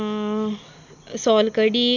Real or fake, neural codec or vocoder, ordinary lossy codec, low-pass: real; none; none; 7.2 kHz